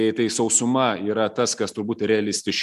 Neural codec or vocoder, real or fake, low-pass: none; real; 14.4 kHz